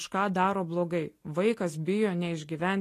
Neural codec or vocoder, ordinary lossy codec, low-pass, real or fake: none; AAC, 48 kbps; 14.4 kHz; real